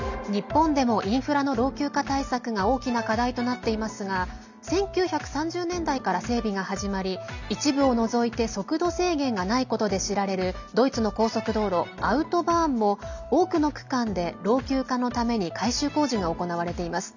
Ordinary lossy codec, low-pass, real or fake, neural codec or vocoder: none; 7.2 kHz; real; none